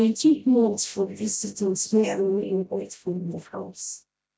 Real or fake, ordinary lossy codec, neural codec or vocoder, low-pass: fake; none; codec, 16 kHz, 0.5 kbps, FreqCodec, smaller model; none